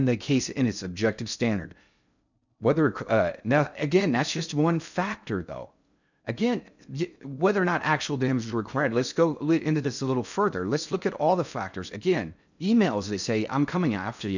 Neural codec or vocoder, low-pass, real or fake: codec, 16 kHz in and 24 kHz out, 0.6 kbps, FocalCodec, streaming, 2048 codes; 7.2 kHz; fake